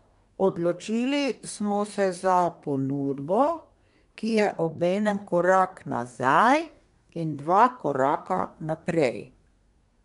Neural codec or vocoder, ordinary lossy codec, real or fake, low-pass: codec, 24 kHz, 1 kbps, SNAC; none; fake; 10.8 kHz